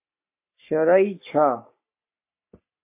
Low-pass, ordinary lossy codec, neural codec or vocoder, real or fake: 3.6 kHz; MP3, 24 kbps; codec, 44.1 kHz, 3.4 kbps, Pupu-Codec; fake